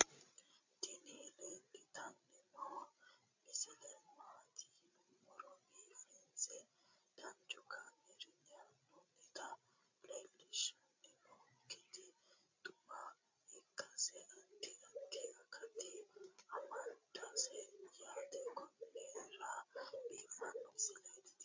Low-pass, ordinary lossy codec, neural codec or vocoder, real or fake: 7.2 kHz; MP3, 48 kbps; codec, 16 kHz, 16 kbps, FreqCodec, larger model; fake